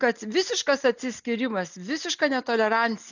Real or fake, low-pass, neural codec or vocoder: real; 7.2 kHz; none